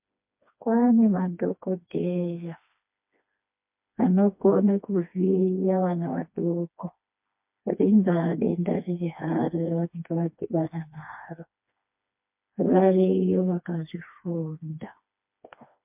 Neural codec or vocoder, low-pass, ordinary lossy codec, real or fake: codec, 16 kHz, 2 kbps, FreqCodec, smaller model; 3.6 kHz; MP3, 32 kbps; fake